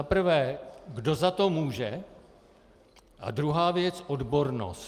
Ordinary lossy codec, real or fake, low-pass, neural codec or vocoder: Opus, 32 kbps; real; 14.4 kHz; none